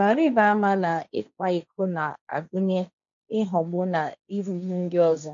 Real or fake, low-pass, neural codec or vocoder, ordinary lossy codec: fake; 7.2 kHz; codec, 16 kHz, 1.1 kbps, Voila-Tokenizer; none